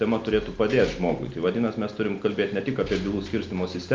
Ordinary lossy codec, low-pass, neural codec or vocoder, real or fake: Opus, 24 kbps; 7.2 kHz; none; real